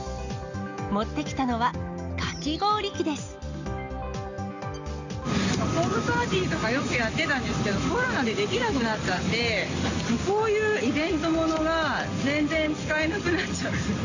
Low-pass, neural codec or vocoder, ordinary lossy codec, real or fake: 7.2 kHz; none; Opus, 64 kbps; real